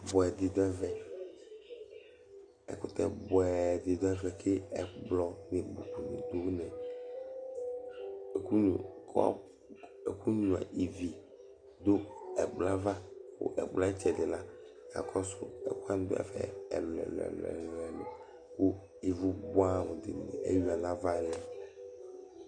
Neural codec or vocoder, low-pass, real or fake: none; 9.9 kHz; real